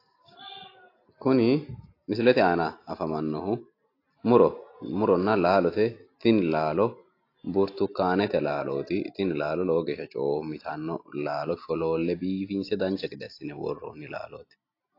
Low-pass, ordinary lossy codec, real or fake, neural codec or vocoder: 5.4 kHz; AAC, 32 kbps; real; none